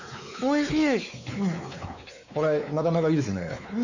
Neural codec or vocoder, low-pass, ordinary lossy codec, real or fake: codec, 16 kHz, 4 kbps, X-Codec, WavLM features, trained on Multilingual LibriSpeech; 7.2 kHz; none; fake